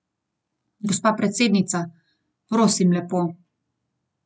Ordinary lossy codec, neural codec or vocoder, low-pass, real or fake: none; none; none; real